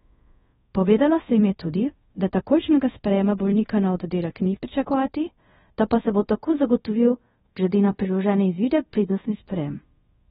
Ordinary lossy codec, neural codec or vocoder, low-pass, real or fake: AAC, 16 kbps; codec, 24 kHz, 0.5 kbps, DualCodec; 10.8 kHz; fake